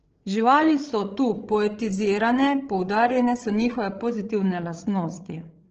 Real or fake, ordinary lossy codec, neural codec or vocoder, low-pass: fake; Opus, 16 kbps; codec, 16 kHz, 8 kbps, FreqCodec, larger model; 7.2 kHz